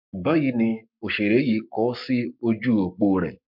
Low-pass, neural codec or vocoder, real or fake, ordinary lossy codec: 5.4 kHz; codec, 44.1 kHz, 7.8 kbps, Pupu-Codec; fake; MP3, 48 kbps